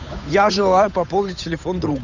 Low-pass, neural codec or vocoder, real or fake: 7.2 kHz; codec, 16 kHz, 8 kbps, FunCodec, trained on Chinese and English, 25 frames a second; fake